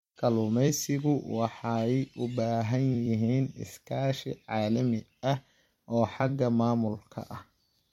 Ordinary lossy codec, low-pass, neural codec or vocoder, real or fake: MP3, 64 kbps; 19.8 kHz; vocoder, 44.1 kHz, 128 mel bands every 256 samples, BigVGAN v2; fake